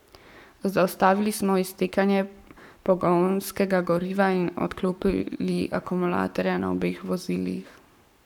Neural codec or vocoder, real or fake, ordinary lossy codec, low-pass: codec, 44.1 kHz, 7.8 kbps, Pupu-Codec; fake; none; 19.8 kHz